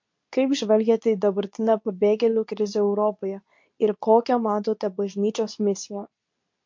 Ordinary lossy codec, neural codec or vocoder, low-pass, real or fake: MP3, 48 kbps; codec, 24 kHz, 0.9 kbps, WavTokenizer, medium speech release version 2; 7.2 kHz; fake